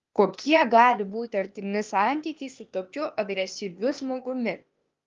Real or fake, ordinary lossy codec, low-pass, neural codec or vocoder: fake; Opus, 32 kbps; 7.2 kHz; codec, 16 kHz, 0.8 kbps, ZipCodec